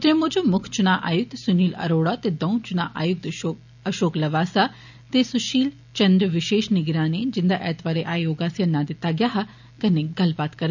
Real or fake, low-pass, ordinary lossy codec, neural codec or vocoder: real; 7.2 kHz; none; none